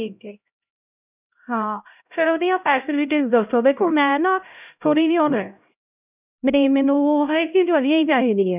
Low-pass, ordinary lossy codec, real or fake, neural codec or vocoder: 3.6 kHz; none; fake; codec, 16 kHz, 0.5 kbps, X-Codec, HuBERT features, trained on LibriSpeech